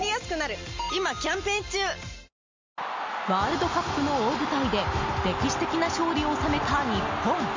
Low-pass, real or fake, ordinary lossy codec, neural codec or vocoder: 7.2 kHz; real; MP3, 64 kbps; none